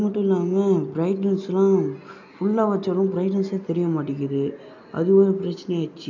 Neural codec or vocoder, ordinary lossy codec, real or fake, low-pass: none; none; real; 7.2 kHz